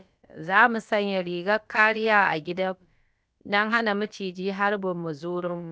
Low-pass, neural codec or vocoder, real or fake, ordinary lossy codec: none; codec, 16 kHz, about 1 kbps, DyCAST, with the encoder's durations; fake; none